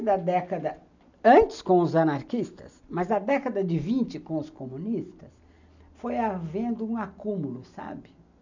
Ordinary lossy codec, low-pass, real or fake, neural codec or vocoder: none; 7.2 kHz; real; none